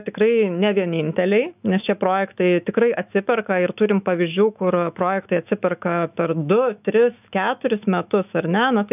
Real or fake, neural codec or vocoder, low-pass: fake; autoencoder, 48 kHz, 128 numbers a frame, DAC-VAE, trained on Japanese speech; 3.6 kHz